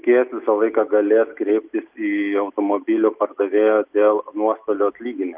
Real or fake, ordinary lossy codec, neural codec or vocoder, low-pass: real; Opus, 24 kbps; none; 3.6 kHz